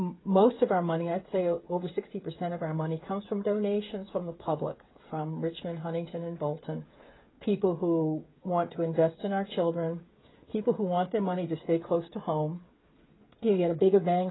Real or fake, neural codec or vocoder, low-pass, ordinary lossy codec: fake; codec, 16 kHz, 8 kbps, FreqCodec, smaller model; 7.2 kHz; AAC, 16 kbps